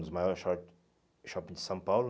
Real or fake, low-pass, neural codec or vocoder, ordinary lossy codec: real; none; none; none